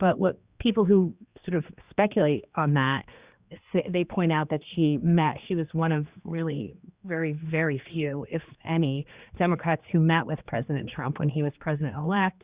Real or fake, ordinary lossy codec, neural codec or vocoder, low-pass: fake; Opus, 64 kbps; codec, 16 kHz, 2 kbps, X-Codec, HuBERT features, trained on general audio; 3.6 kHz